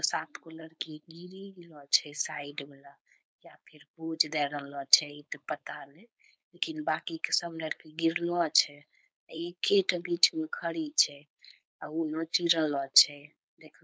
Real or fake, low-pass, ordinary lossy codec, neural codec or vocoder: fake; none; none; codec, 16 kHz, 4.8 kbps, FACodec